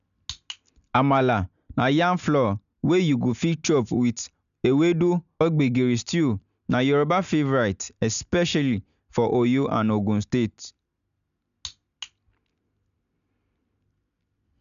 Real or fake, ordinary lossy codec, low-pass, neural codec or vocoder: real; none; 7.2 kHz; none